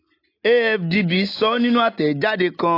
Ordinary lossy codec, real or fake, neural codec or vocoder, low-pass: AAC, 32 kbps; real; none; 5.4 kHz